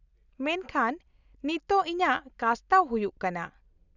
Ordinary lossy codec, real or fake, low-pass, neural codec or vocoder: none; real; 7.2 kHz; none